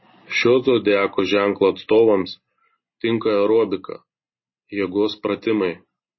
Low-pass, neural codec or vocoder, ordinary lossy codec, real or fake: 7.2 kHz; none; MP3, 24 kbps; real